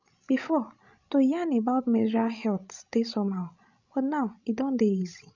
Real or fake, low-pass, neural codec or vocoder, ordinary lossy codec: fake; 7.2 kHz; codec, 16 kHz, 16 kbps, FreqCodec, larger model; none